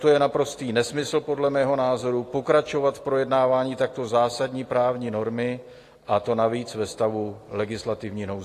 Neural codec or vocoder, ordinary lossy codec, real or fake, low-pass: none; AAC, 48 kbps; real; 14.4 kHz